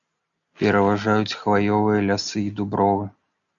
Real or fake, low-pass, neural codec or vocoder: real; 7.2 kHz; none